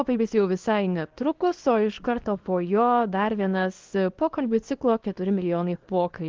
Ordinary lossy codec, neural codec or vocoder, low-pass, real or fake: Opus, 16 kbps; codec, 24 kHz, 0.9 kbps, WavTokenizer, small release; 7.2 kHz; fake